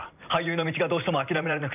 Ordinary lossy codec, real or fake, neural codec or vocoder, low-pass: none; fake; vocoder, 44.1 kHz, 128 mel bands every 512 samples, BigVGAN v2; 3.6 kHz